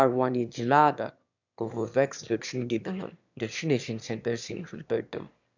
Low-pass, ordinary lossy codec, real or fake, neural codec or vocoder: 7.2 kHz; none; fake; autoencoder, 22.05 kHz, a latent of 192 numbers a frame, VITS, trained on one speaker